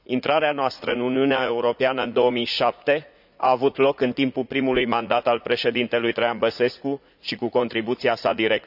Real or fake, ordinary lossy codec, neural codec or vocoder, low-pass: fake; none; vocoder, 44.1 kHz, 80 mel bands, Vocos; 5.4 kHz